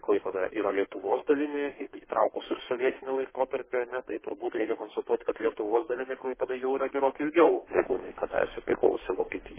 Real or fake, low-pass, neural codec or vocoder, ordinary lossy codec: fake; 3.6 kHz; codec, 32 kHz, 1.9 kbps, SNAC; MP3, 16 kbps